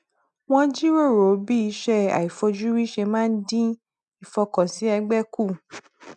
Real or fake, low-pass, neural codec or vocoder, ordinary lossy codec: real; 10.8 kHz; none; none